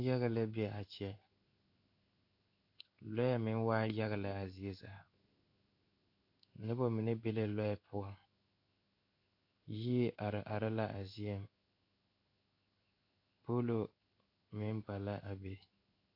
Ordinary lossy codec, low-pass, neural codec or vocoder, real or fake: AAC, 48 kbps; 5.4 kHz; codec, 16 kHz in and 24 kHz out, 1 kbps, XY-Tokenizer; fake